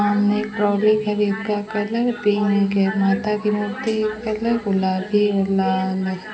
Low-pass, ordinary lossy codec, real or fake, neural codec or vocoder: none; none; real; none